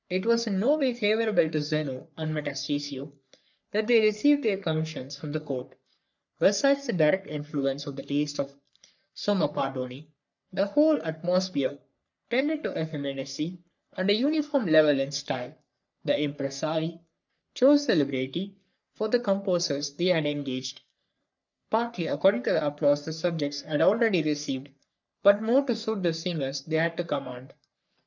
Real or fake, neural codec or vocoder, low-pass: fake; codec, 44.1 kHz, 3.4 kbps, Pupu-Codec; 7.2 kHz